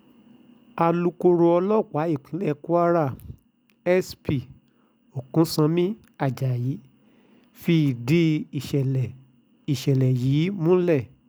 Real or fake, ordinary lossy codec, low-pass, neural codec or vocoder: real; none; none; none